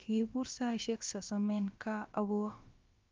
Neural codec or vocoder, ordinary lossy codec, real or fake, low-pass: codec, 16 kHz, about 1 kbps, DyCAST, with the encoder's durations; Opus, 32 kbps; fake; 7.2 kHz